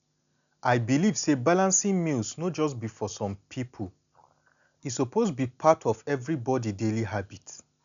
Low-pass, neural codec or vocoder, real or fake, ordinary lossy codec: 7.2 kHz; none; real; none